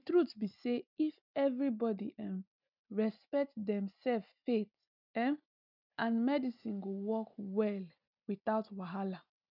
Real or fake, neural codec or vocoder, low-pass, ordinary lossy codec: real; none; 5.4 kHz; none